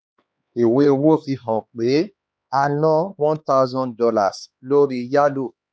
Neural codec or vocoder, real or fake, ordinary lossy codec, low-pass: codec, 16 kHz, 4 kbps, X-Codec, HuBERT features, trained on LibriSpeech; fake; none; none